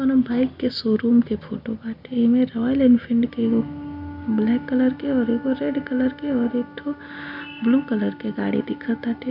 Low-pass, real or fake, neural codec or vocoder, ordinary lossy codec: 5.4 kHz; real; none; MP3, 48 kbps